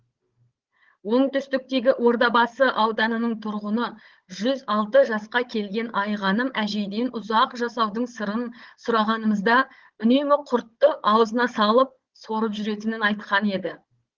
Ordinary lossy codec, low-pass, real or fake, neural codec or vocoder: Opus, 16 kbps; 7.2 kHz; fake; codec, 16 kHz, 16 kbps, FunCodec, trained on Chinese and English, 50 frames a second